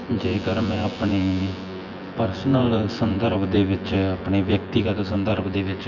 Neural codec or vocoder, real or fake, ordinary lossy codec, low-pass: vocoder, 24 kHz, 100 mel bands, Vocos; fake; none; 7.2 kHz